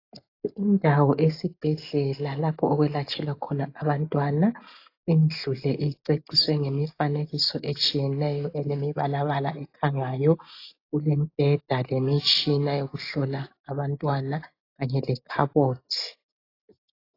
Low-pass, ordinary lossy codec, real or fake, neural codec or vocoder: 5.4 kHz; AAC, 32 kbps; real; none